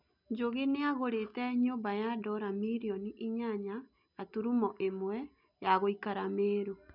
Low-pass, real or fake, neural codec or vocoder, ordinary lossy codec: 5.4 kHz; real; none; none